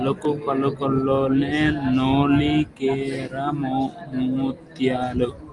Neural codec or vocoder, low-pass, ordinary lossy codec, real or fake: none; 10.8 kHz; Opus, 32 kbps; real